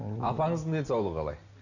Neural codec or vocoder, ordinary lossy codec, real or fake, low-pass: none; none; real; 7.2 kHz